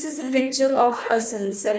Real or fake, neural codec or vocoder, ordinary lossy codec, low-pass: fake; codec, 16 kHz, 1 kbps, FunCodec, trained on Chinese and English, 50 frames a second; none; none